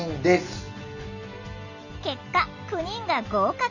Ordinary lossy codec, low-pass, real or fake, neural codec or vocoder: none; 7.2 kHz; real; none